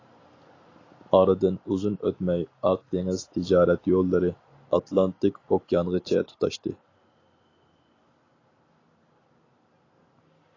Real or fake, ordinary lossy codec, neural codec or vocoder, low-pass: real; AAC, 32 kbps; none; 7.2 kHz